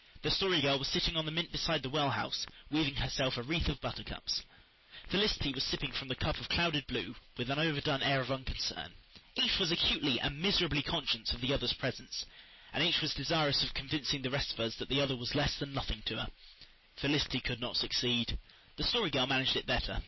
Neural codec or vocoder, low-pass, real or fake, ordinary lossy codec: none; 7.2 kHz; real; MP3, 24 kbps